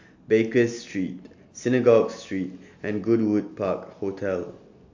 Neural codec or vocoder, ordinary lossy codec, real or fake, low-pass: none; AAC, 48 kbps; real; 7.2 kHz